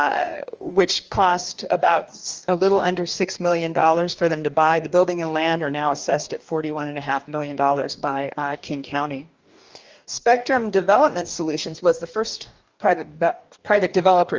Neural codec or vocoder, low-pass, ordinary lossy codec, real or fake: codec, 44.1 kHz, 2.6 kbps, DAC; 7.2 kHz; Opus, 24 kbps; fake